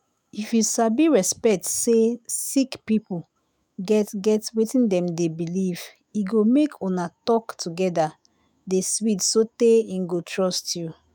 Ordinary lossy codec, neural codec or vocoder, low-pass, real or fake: none; autoencoder, 48 kHz, 128 numbers a frame, DAC-VAE, trained on Japanese speech; none; fake